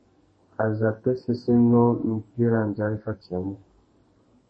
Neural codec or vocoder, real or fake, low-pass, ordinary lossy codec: codec, 44.1 kHz, 2.6 kbps, SNAC; fake; 9.9 kHz; MP3, 32 kbps